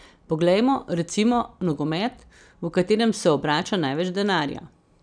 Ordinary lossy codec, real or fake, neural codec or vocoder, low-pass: none; real; none; 9.9 kHz